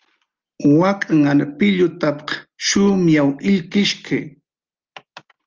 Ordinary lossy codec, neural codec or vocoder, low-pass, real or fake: Opus, 24 kbps; none; 7.2 kHz; real